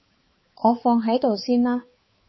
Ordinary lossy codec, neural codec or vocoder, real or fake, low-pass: MP3, 24 kbps; codec, 16 kHz, 4 kbps, X-Codec, HuBERT features, trained on balanced general audio; fake; 7.2 kHz